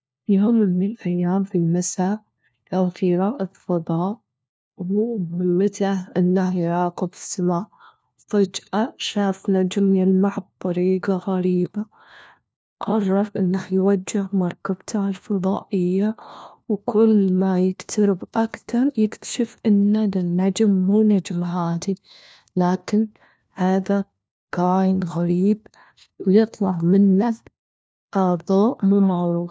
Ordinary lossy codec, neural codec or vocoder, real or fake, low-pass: none; codec, 16 kHz, 1 kbps, FunCodec, trained on LibriTTS, 50 frames a second; fake; none